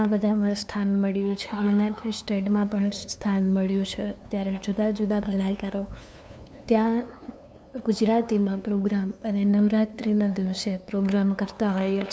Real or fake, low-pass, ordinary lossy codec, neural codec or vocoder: fake; none; none; codec, 16 kHz, 2 kbps, FunCodec, trained on LibriTTS, 25 frames a second